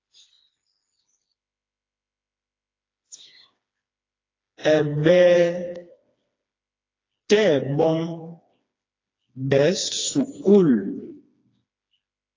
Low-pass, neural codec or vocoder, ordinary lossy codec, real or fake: 7.2 kHz; codec, 16 kHz, 2 kbps, FreqCodec, smaller model; AAC, 32 kbps; fake